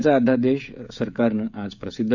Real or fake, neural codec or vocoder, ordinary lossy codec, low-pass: fake; codec, 16 kHz, 16 kbps, FreqCodec, smaller model; none; 7.2 kHz